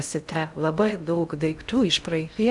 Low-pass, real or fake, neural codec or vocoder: 10.8 kHz; fake; codec, 16 kHz in and 24 kHz out, 0.6 kbps, FocalCodec, streaming, 2048 codes